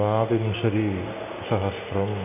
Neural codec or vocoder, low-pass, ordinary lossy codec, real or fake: none; 3.6 kHz; none; real